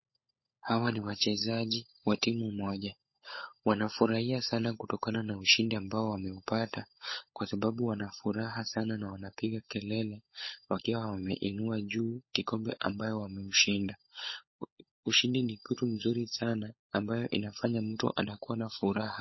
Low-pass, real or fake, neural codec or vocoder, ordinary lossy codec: 7.2 kHz; fake; codec, 16 kHz, 16 kbps, FunCodec, trained on LibriTTS, 50 frames a second; MP3, 24 kbps